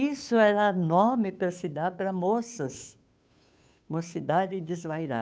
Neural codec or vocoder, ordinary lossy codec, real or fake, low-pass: codec, 16 kHz, 2 kbps, FunCodec, trained on Chinese and English, 25 frames a second; none; fake; none